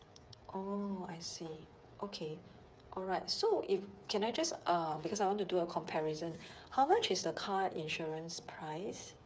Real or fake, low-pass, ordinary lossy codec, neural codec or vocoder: fake; none; none; codec, 16 kHz, 8 kbps, FreqCodec, smaller model